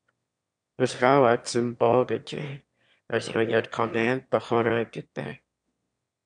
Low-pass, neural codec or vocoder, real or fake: 9.9 kHz; autoencoder, 22.05 kHz, a latent of 192 numbers a frame, VITS, trained on one speaker; fake